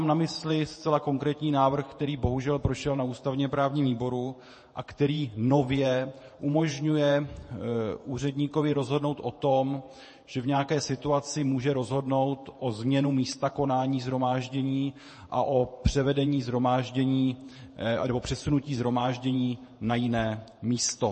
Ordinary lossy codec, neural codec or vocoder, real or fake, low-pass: MP3, 32 kbps; vocoder, 48 kHz, 128 mel bands, Vocos; fake; 10.8 kHz